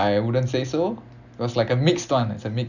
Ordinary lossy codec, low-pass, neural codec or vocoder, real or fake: none; 7.2 kHz; none; real